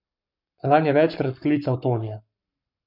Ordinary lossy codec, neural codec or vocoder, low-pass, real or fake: none; codec, 44.1 kHz, 7.8 kbps, Pupu-Codec; 5.4 kHz; fake